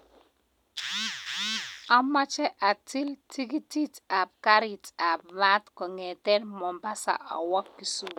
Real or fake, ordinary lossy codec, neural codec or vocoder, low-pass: real; none; none; none